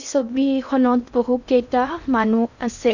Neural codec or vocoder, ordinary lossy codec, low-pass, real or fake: codec, 16 kHz in and 24 kHz out, 0.6 kbps, FocalCodec, streaming, 2048 codes; none; 7.2 kHz; fake